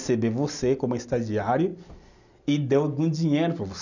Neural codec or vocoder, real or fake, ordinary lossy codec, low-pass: none; real; none; 7.2 kHz